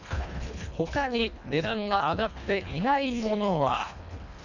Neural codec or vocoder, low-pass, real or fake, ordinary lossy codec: codec, 24 kHz, 1.5 kbps, HILCodec; 7.2 kHz; fake; none